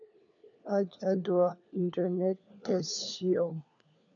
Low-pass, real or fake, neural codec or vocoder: 7.2 kHz; fake; codec, 16 kHz, 4 kbps, FunCodec, trained on LibriTTS, 50 frames a second